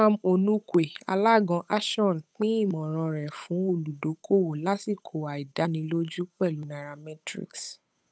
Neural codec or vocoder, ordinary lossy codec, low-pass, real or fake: codec, 16 kHz, 8 kbps, FunCodec, trained on Chinese and English, 25 frames a second; none; none; fake